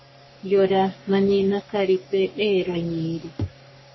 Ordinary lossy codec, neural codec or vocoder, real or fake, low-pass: MP3, 24 kbps; codec, 44.1 kHz, 3.4 kbps, Pupu-Codec; fake; 7.2 kHz